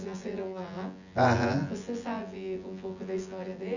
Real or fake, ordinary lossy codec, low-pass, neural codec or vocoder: fake; none; 7.2 kHz; vocoder, 24 kHz, 100 mel bands, Vocos